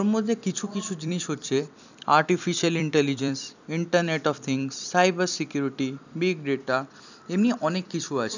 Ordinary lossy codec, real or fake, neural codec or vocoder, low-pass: none; fake; vocoder, 44.1 kHz, 128 mel bands every 256 samples, BigVGAN v2; 7.2 kHz